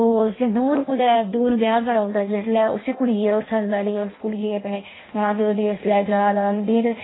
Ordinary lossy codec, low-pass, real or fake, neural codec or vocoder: AAC, 16 kbps; 7.2 kHz; fake; codec, 16 kHz in and 24 kHz out, 0.6 kbps, FireRedTTS-2 codec